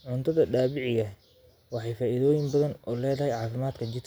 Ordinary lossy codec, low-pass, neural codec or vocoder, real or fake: none; none; none; real